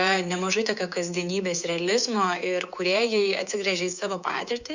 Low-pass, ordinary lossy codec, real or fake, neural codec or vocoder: 7.2 kHz; Opus, 64 kbps; fake; codec, 24 kHz, 3.1 kbps, DualCodec